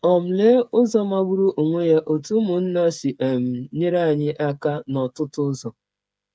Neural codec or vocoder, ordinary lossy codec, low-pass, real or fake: codec, 16 kHz, 8 kbps, FreqCodec, smaller model; none; none; fake